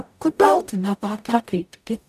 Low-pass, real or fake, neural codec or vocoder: 14.4 kHz; fake; codec, 44.1 kHz, 0.9 kbps, DAC